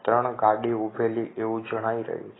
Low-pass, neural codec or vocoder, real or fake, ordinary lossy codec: 7.2 kHz; none; real; AAC, 16 kbps